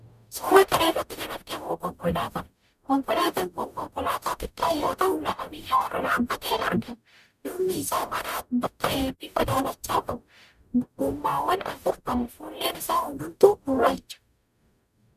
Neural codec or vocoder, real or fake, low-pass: codec, 44.1 kHz, 0.9 kbps, DAC; fake; 14.4 kHz